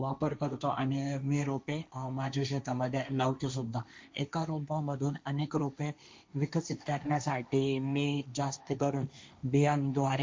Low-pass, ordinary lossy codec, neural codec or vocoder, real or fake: none; none; codec, 16 kHz, 1.1 kbps, Voila-Tokenizer; fake